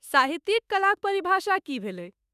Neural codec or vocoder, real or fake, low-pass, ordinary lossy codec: autoencoder, 48 kHz, 32 numbers a frame, DAC-VAE, trained on Japanese speech; fake; 14.4 kHz; none